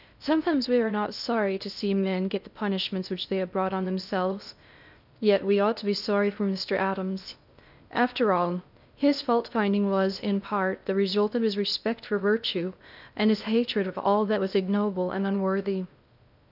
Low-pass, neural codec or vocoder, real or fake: 5.4 kHz; codec, 16 kHz in and 24 kHz out, 0.6 kbps, FocalCodec, streaming, 2048 codes; fake